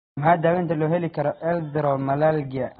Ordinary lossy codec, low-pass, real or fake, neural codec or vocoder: AAC, 16 kbps; 7.2 kHz; real; none